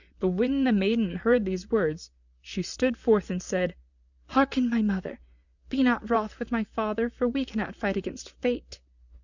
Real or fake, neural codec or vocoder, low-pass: fake; vocoder, 44.1 kHz, 128 mel bands, Pupu-Vocoder; 7.2 kHz